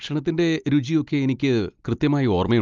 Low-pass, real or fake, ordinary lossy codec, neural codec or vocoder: 7.2 kHz; real; Opus, 32 kbps; none